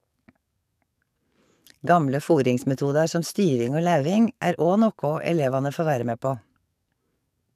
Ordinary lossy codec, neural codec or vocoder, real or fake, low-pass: none; codec, 44.1 kHz, 7.8 kbps, DAC; fake; 14.4 kHz